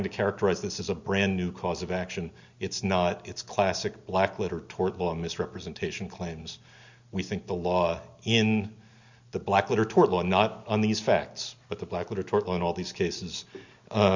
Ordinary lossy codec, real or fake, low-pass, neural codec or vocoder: Opus, 64 kbps; real; 7.2 kHz; none